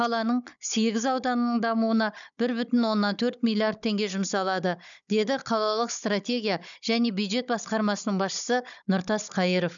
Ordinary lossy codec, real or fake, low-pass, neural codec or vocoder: none; fake; 7.2 kHz; codec, 16 kHz, 16 kbps, FunCodec, trained on Chinese and English, 50 frames a second